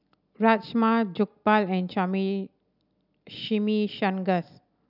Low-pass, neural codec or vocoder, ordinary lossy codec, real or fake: 5.4 kHz; none; none; real